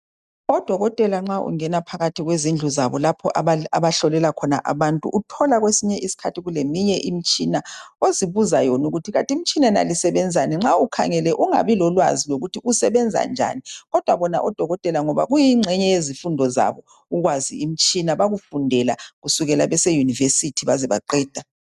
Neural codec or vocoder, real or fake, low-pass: none; real; 14.4 kHz